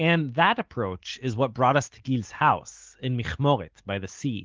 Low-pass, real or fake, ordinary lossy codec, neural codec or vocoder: 7.2 kHz; real; Opus, 16 kbps; none